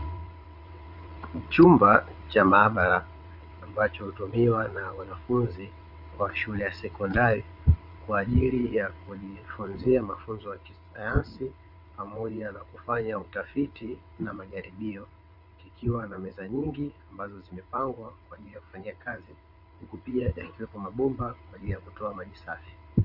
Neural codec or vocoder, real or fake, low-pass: vocoder, 22.05 kHz, 80 mel bands, WaveNeXt; fake; 5.4 kHz